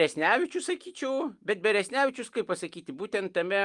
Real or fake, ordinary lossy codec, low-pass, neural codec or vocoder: real; Opus, 32 kbps; 10.8 kHz; none